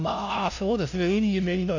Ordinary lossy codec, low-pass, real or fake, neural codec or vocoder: none; 7.2 kHz; fake; codec, 16 kHz, 0.5 kbps, FunCodec, trained on LibriTTS, 25 frames a second